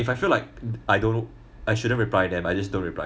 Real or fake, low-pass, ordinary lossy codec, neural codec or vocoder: real; none; none; none